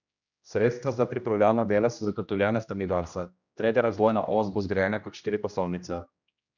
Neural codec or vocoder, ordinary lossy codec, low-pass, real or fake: codec, 16 kHz, 1 kbps, X-Codec, HuBERT features, trained on general audio; none; 7.2 kHz; fake